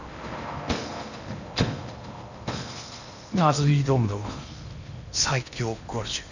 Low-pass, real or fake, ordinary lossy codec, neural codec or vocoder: 7.2 kHz; fake; none; codec, 16 kHz in and 24 kHz out, 0.8 kbps, FocalCodec, streaming, 65536 codes